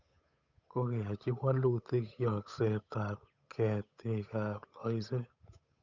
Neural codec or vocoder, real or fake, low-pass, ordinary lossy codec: codec, 16 kHz, 8 kbps, FunCodec, trained on Chinese and English, 25 frames a second; fake; 7.2 kHz; none